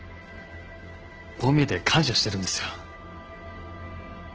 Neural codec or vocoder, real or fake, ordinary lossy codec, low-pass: none; real; Opus, 16 kbps; 7.2 kHz